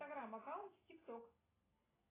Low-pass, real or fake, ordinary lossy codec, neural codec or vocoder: 3.6 kHz; real; AAC, 16 kbps; none